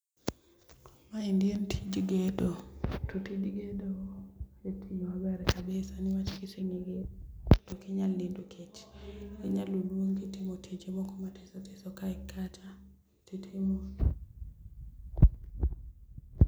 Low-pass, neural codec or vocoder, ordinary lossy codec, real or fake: none; none; none; real